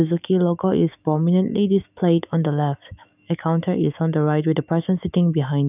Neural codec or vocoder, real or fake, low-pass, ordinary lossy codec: autoencoder, 48 kHz, 128 numbers a frame, DAC-VAE, trained on Japanese speech; fake; 3.6 kHz; none